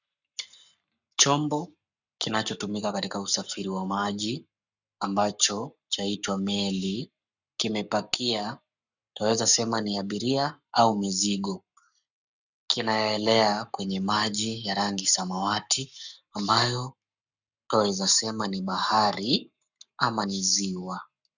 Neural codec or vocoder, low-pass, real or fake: codec, 44.1 kHz, 7.8 kbps, Pupu-Codec; 7.2 kHz; fake